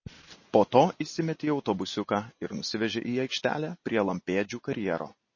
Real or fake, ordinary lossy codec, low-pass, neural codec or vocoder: real; MP3, 32 kbps; 7.2 kHz; none